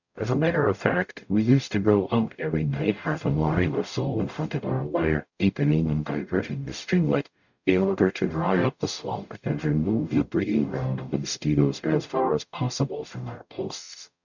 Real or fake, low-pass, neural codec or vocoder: fake; 7.2 kHz; codec, 44.1 kHz, 0.9 kbps, DAC